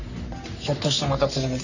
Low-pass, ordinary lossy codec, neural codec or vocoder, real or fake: 7.2 kHz; none; codec, 44.1 kHz, 3.4 kbps, Pupu-Codec; fake